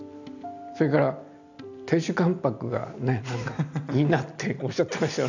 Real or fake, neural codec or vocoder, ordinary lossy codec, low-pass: real; none; none; 7.2 kHz